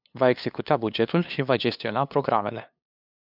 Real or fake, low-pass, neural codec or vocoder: fake; 5.4 kHz; codec, 16 kHz, 2 kbps, FunCodec, trained on LibriTTS, 25 frames a second